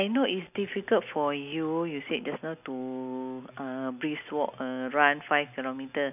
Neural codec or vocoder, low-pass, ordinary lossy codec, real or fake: none; 3.6 kHz; none; real